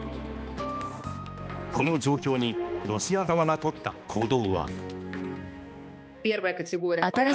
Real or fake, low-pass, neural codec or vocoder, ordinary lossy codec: fake; none; codec, 16 kHz, 2 kbps, X-Codec, HuBERT features, trained on balanced general audio; none